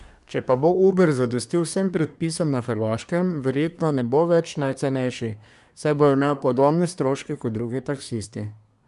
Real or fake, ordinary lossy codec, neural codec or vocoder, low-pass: fake; none; codec, 24 kHz, 1 kbps, SNAC; 10.8 kHz